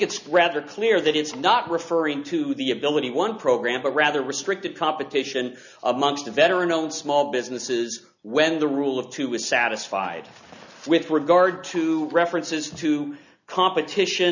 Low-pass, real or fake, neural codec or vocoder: 7.2 kHz; real; none